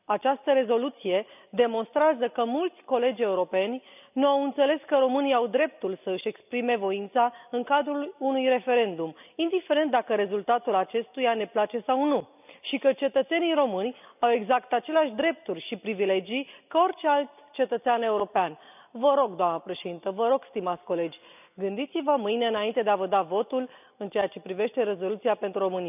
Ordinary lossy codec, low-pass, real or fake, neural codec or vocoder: none; 3.6 kHz; real; none